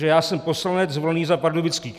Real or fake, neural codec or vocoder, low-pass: real; none; 14.4 kHz